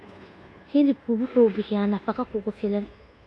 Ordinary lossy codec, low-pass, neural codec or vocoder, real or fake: none; none; codec, 24 kHz, 1.2 kbps, DualCodec; fake